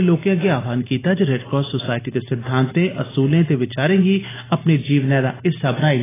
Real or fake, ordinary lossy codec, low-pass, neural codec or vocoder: real; AAC, 16 kbps; 3.6 kHz; none